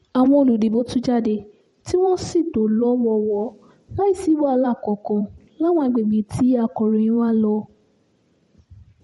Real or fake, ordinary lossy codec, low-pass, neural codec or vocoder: fake; MP3, 48 kbps; 19.8 kHz; vocoder, 44.1 kHz, 128 mel bands every 512 samples, BigVGAN v2